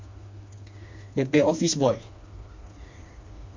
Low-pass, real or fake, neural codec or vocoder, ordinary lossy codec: 7.2 kHz; fake; codec, 16 kHz, 2 kbps, FreqCodec, smaller model; MP3, 48 kbps